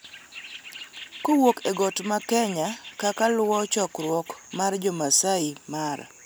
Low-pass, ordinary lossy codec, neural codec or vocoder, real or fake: none; none; none; real